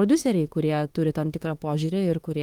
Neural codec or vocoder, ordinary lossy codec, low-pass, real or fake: autoencoder, 48 kHz, 32 numbers a frame, DAC-VAE, trained on Japanese speech; Opus, 24 kbps; 19.8 kHz; fake